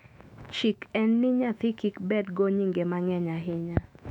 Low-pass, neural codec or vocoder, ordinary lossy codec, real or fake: 19.8 kHz; autoencoder, 48 kHz, 128 numbers a frame, DAC-VAE, trained on Japanese speech; none; fake